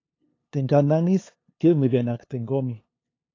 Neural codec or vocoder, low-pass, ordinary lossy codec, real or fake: codec, 16 kHz, 2 kbps, FunCodec, trained on LibriTTS, 25 frames a second; 7.2 kHz; AAC, 32 kbps; fake